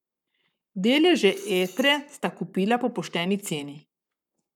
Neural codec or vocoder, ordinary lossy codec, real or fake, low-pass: codec, 44.1 kHz, 7.8 kbps, Pupu-Codec; none; fake; 19.8 kHz